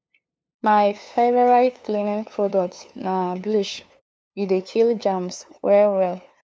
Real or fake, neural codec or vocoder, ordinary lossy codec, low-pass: fake; codec, 16 kHz, 2 kbps, FunCodec, trained on LibriTTS, 25 frames a second; none; none